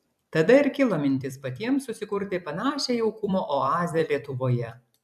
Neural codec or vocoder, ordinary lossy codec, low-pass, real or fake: vocoder, 44.1 kHz, 128 mel bands every 256 samples, BigVGAN v2; AAC, 96 kbps; 14.4 kHz; fake